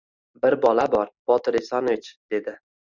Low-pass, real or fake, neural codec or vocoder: 7.2 kHz; fake; vocoder, 44.1 kHz, 128 mel bands every 512 samples, BigVGAN v2